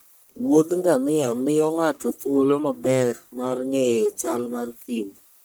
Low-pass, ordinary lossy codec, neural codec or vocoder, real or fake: none; none; codec, 44.1 kHz, 1.7 kbps, Pupu-Codec; fake